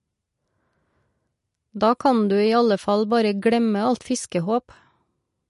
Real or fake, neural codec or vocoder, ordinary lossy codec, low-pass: real; none; MP3, 48 kbps; 14.4 kHz